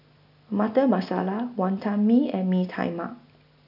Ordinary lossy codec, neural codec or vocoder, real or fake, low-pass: none; none; real; 5.4 kHz